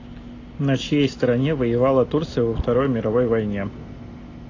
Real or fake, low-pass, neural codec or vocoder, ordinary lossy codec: real; 7.2 kHz; none; AAC, 32 kbps